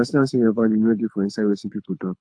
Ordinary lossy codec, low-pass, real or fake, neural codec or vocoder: AAC, 64 kbps; 9.9 kHz; fake; codec, 24 kHz, 6 kbps, HILCodec